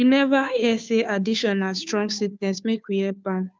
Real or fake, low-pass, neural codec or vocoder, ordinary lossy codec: fake; none; codec, 16 kHz, 2 kbps, FunCodec, trained on Chinese and English, 25 frames a second; none